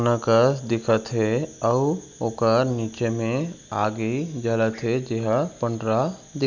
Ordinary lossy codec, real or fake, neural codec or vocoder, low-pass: none; real; none; 7.2 kHz